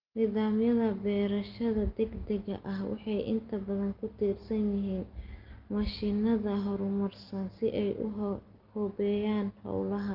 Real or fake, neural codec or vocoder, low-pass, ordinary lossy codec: real; none; 5.4 kHz; Opus, 24 kbps